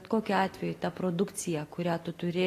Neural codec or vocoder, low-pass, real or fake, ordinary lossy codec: none; 14.4 kHz; real; AAC, 48 kbps